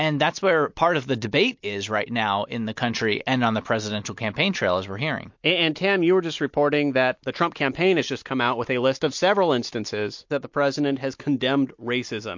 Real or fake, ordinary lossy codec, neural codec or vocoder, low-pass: real; MP3, 48 kbps; none; 7.2 kHz